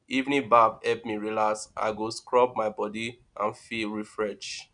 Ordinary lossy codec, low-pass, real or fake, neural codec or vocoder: none; 9.9 kHz; real; none